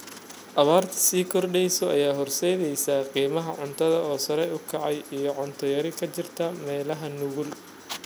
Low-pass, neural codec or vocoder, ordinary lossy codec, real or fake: none; none; none; real